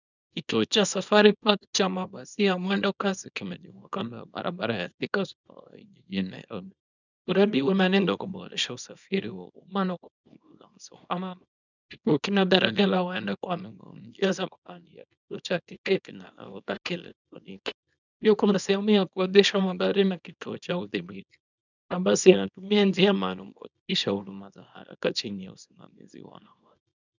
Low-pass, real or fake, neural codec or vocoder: 7.2 kHz; fake; codec, 24 kHz, 0.9 kbps, WavTokenizer, small release